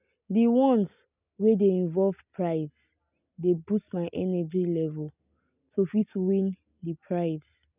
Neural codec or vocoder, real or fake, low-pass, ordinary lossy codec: none; real; 3.6 kHz; none